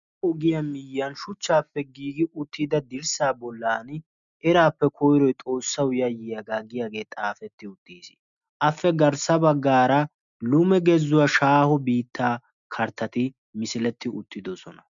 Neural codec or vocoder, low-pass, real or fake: none; 7.2 kHz; real